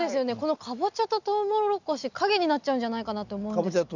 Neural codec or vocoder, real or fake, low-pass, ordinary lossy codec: none; real; 7.2 kHz; none